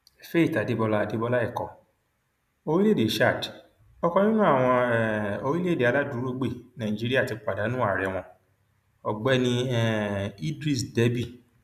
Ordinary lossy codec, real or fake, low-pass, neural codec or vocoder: none; real; 14.4 kHz; none